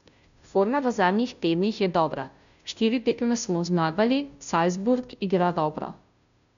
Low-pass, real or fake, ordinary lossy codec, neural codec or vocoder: 7.2 kHz; fake; none; codec, 16 kHz, 0.5 kbps, FunCodec, trained on Chinese and English, 25 frames a second